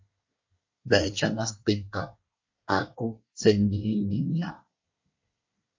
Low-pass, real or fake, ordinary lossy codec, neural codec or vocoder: 7.2 kHz; fake; MP3, 48 kbps; codec, 24 kHz, 1 kbps, SNAC